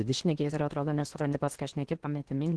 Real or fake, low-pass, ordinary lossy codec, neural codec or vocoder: fake; 10.8 kHz; Opus, 16 kbps; codec, 16 kHz in and 24 kHz out, 0.8 kbps, FocalCodec, streaming, 65536 codes